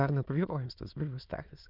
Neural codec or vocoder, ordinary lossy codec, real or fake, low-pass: autoencoder, 22.05 kHz, a latent of 192 numbers a frame, VITS, trained on many speakers; Opus, 24 kbps; fake; 5.4 kHz